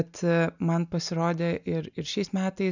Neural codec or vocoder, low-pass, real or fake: none; 7.2 kHz; real